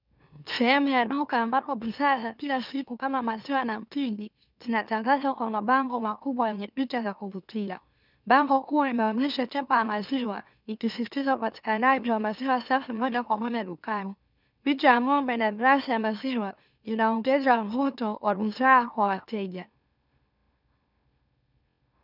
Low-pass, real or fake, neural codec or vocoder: 5.4 kHz; fake; autoencoder, 44.1 kHz, a latent of 192 numbers a frame, MeloTTS